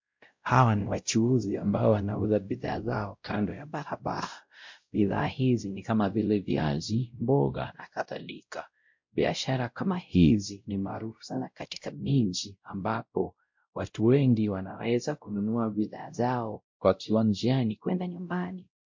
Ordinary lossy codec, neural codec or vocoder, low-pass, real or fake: MP3, 48 kbps; codec, 16 kHz, 0.5 kbps, X-Codec, WavLM features, trained on Multilingual LibriSpeech; 7.2 kHz; fake